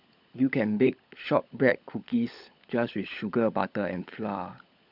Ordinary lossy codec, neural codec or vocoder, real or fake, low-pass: none; codec, 16 kHz, 16 kbps, FunCodec, trained on LibriTTS, 50 frames a second; fake; 5.4 kHz